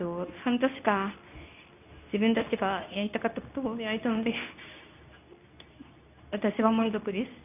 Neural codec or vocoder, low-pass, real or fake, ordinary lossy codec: codec, 24 kHz, 0.9 kbps, WavTokenizer, medium speech release version 2; 3.6 kHz; fake; none